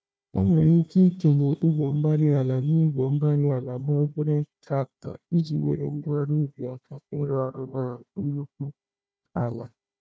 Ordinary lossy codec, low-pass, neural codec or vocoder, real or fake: none; none; codec, 16 kHz, 1 kbps, FunCodec, trained on Chinese and English, 50 frames a second; fake